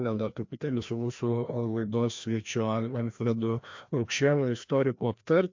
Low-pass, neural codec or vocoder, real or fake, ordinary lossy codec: 7.2 kHz; codec, 16 kHz, 1 kbps, FreqCodec, larger model; fake; MP3, 48 kbps